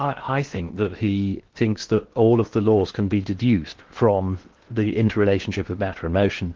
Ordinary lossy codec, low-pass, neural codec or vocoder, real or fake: Opus, 16 kbps; 7.2 kHz; codec, 16 kHz in and 24 kHz out, 0.8 kbps, FocalCodec, streaming, 65536 codes; fake